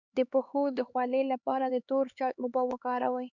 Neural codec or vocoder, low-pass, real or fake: codec, 16 kHz, 4 kbps, X-Codec, HuBERT features, trained on LibriSpeech; 7.2 kHz; fake